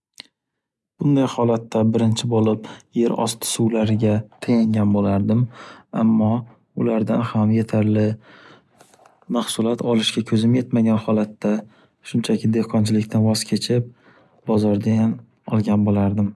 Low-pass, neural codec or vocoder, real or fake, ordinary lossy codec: none; none; real; none